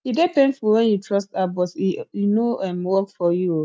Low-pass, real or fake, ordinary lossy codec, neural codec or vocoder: none; real; none; none